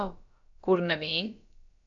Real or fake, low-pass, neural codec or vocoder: fake; 7.2 kHz; codec, 16 kHz, about 1 kbps, DyCAST, with the encoder's durations